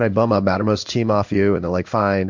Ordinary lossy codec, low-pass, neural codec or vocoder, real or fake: MP3, 64 kbps; 7.2 kHz; codec, 16 kHz in and 24 kHz out, 1 kbps, XY-Tokenizer; fake